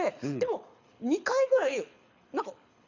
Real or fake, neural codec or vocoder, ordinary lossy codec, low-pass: fake; codec, 24 kHz, 6 kbps, HILCodec; none; 7.2 kHz